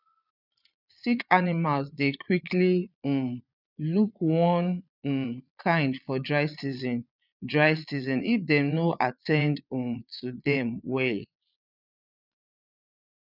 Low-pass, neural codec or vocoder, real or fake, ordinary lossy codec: 5.4 kHz; vocoder, 22.05 kHz, 80 mel bands, Vocos; fake; none